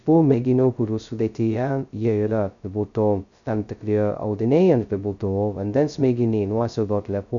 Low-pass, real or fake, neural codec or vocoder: 7.2 kHz; fake; codec, 16 kHz, 0.2 kbps, FocalCodec